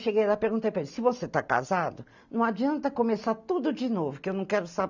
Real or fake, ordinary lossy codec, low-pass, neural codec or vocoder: real; none; 7.2 kHz; none